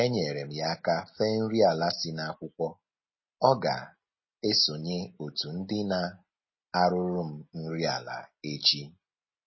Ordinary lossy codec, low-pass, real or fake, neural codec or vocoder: MP3, 24 kbps; 7.2 kHz; real; none